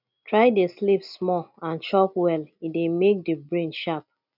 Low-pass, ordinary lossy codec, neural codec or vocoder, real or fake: 5.4 kHz; none; none; real